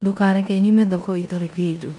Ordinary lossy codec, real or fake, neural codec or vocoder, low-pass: none; fake; codec, 16 kHz in and 24 kHz out, 0.9 kbps, LongCat-Audio-Codec, four codebook decoder; 10.8 kHz